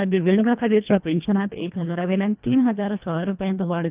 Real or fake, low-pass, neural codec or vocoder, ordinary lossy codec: fake; 3.6 kHz; codec, 24 kHz, 1.5 kbps, HILCodec; Opus, 24 kbps